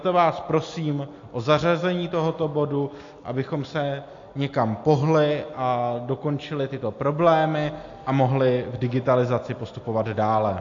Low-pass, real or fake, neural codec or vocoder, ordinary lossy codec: 7.2 kHz; real; none; AAC, 48 kbps